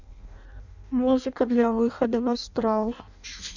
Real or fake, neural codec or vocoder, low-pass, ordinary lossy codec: fake; codec, 16 kHz in and 24 kHz out, 0.6 kbps, FireRedTTS-2 codec; 7.2 kHz; none